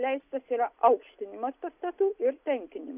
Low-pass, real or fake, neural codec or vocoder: 3.6 kHz; real; none